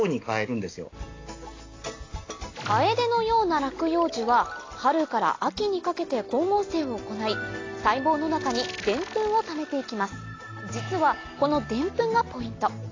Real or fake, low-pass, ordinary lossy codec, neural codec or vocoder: real; 7.2 kHz; AAC, 32 kbps; none